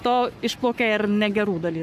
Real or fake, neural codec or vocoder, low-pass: fake; codec, 44.1 kHz, 7.8 kbps, Pupu-Codec; 14.4 kHz